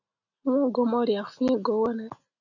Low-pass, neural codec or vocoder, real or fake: 7.2 kHz; none; real